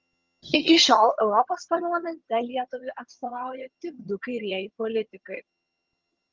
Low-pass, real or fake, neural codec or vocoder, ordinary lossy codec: 7.2 kHz; fake; vocoder, 22.05 kHz, 80 mel bands, HiFi-GAN; Opus, 32 kbps